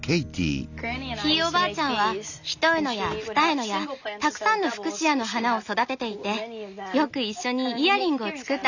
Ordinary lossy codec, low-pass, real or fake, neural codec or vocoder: none; 7.2 kHz; real; none